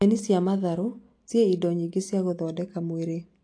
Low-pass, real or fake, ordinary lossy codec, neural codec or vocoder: 9.9 kHz; real; AAC, 48 kbps; none